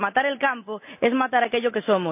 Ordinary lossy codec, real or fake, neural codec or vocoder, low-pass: none; real; none; 3.6 kHz